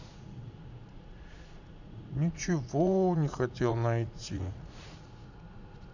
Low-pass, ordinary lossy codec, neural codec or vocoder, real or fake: 7.2 kHz; none; vocoder, 44.1 kHz, 80 mel bands, Vocos; fake